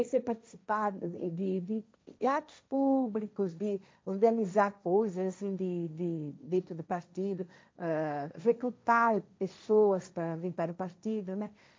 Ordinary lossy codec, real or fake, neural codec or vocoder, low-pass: none; fake; codec, 16 kHz, 1.1 kbps, Voila-Tokenizer; none